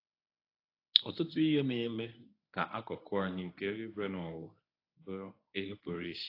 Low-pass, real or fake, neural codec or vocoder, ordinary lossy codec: 5.4 kHz; fake; codec, 24 kHz, 0.9 kbps, WavTokenizer, medium speech release version 1; AAC, 32 kbps